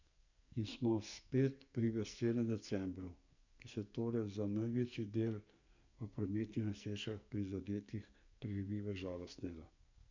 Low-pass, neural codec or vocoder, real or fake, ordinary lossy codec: 7.2 kHz; codec, 44.1 kHz, 2.6 kbps, SNAC; fake; none